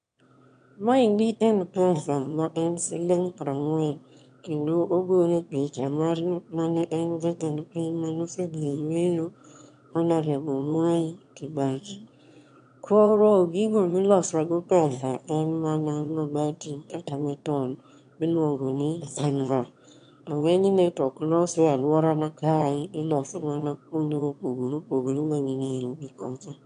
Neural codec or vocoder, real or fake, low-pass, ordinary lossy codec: autoencoder, 22.05 kHz, a latent of 192 numbers a frame, VITS, trained on one speaker; fake; 9.9 kHz; AAC, 64 kbps